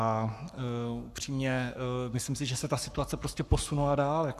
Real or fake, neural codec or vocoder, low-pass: fake; codec, 44.1 kHz, 7.8 kbps, Pupu-Codec; 14.4 kHz